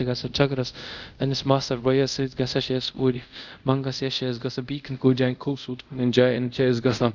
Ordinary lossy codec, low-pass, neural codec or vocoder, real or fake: none; 7.2 kHz; codec, 24 kHz, 0.5 kbps, DualCodec; fake